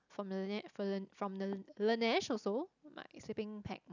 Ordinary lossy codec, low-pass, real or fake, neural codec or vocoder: none; 7.2 kHz; real; none